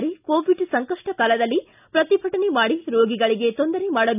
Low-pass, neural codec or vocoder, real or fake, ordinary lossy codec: 3.6 kHz; none; real; none